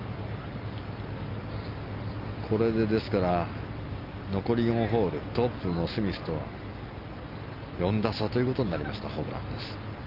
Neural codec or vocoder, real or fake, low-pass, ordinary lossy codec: none; real; 5.4 kHz; Opus, 24 kbps